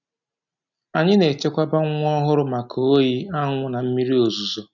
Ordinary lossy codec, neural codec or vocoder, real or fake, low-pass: none; none; real; 7.2 kHz